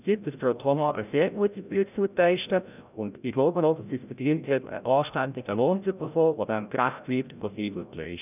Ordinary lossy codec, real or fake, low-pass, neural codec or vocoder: none; fake; 3.6 kHz; codec, 16 kHz, 0.5 kbps, FreqCodec, larger model